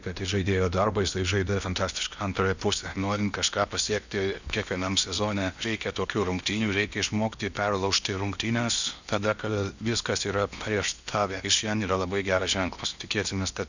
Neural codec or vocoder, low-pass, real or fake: codec, 16 kHz in and 24 kHz out, 0.8 kbps, FocalCodec, streaming, 65536 codes; 7.2 kHz; fake